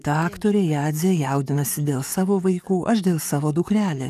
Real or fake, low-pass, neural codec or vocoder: fake; 14.4 kHz; codec, 44.1 kHz, 7.8 kbps, DAC